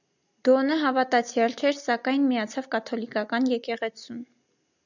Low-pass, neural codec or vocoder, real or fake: 7.2 kHz; none; real